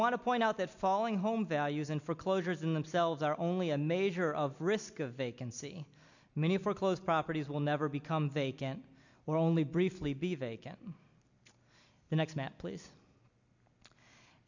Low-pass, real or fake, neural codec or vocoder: 7.2 kHz; real; none